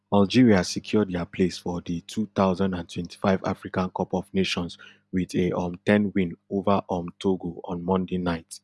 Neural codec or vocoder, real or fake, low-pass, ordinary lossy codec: none; real; none; none